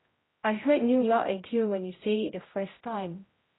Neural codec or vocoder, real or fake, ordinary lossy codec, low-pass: codec, 16 kHz, 0.5 kbps, X-Codec, HuBERT features, trained on general audio; fake; AAC, 16 kbps; 7.2 kHz